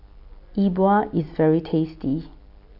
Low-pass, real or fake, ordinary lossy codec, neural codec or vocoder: 5.4 kHz; real; none; none